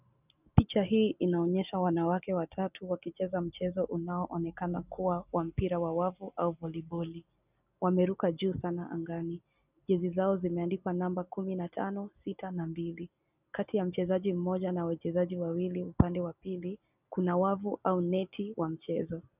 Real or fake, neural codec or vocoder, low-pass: real; none; 3.6 kHz